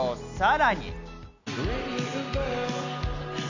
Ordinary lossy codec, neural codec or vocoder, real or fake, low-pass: none; none; real; 7.2 kHz